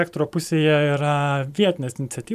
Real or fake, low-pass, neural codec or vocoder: fake; 14.4 kHz; vocoder, 44.1 kHz, 128 mel bands every 512 samples, BigVGAN v2